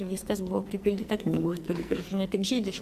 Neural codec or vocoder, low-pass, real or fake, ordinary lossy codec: codec, 44.1 kHz, 2.6 kbps, SNAC; 14.4 kHz; fake; Opus, 64 kbps